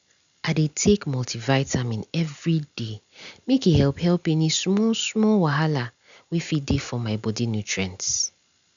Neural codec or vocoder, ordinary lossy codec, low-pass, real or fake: none; none; 7.2 kHz; real